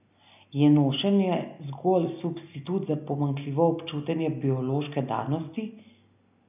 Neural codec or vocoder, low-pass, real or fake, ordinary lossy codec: none; 3.6 kHz; real; none